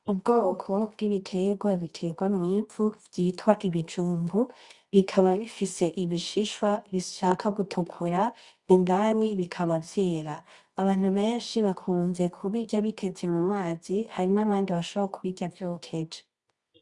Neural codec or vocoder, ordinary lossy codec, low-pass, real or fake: codec, 24 kHz, 0.9 kbps, WavTokenizer, medium music audio release; Opus, 64 kbps; 10.8 kHz; fake